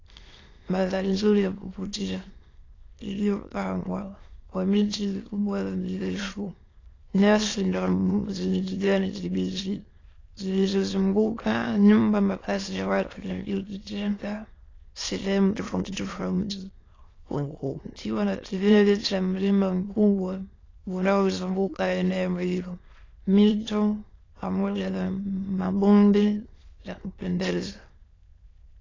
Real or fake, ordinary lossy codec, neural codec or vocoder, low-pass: fake; AAC, 32 kbps; autoencoder, 22.05 kHz, a latent of 192 numbers a frame, VITS, trained on many speakers; 7.2 kHz